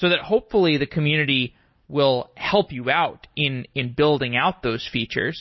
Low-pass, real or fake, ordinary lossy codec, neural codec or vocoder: 7.2 kHz; real; MP3, 24 kbps; none